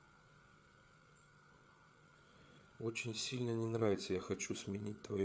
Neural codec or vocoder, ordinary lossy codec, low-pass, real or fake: codec, 16 kHz, 8 kbps, FreqCodec, larger model; none; none; fake